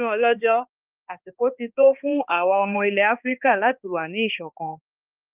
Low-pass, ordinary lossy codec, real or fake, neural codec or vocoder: 3.6 kHz; Opus, 32 kbps; fake; codec, 16 kHz, 2 kbps, X-Codec, HuBERT features, trained on balanced general audio